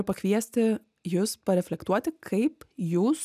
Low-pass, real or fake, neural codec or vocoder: 14.4 kHz; real; none